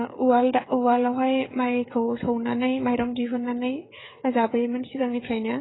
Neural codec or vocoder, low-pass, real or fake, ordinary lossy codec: codec, 16 kHz, 16 kbps, FreqCodec, smaller model; 7.2 kHz; fake; AAC, 16 kbps